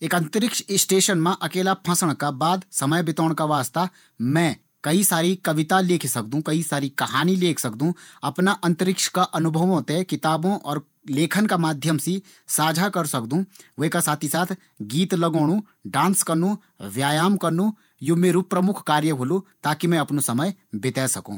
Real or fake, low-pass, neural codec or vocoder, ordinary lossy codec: fake; none; vocoder, 48 kHz, 128 mel bands, Vocos; none